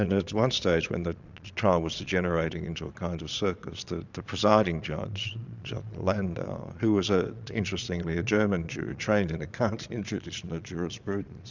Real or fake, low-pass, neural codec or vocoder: fake; 7.2 kHz; vocoder, 22.05 kHz, 80 mel bands, Vocos